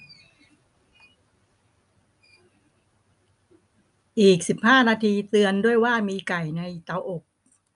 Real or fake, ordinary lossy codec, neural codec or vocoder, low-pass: real; none; none; 10.8 kHz